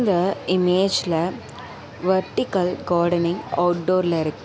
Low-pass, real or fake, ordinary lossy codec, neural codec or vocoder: none; real; none; none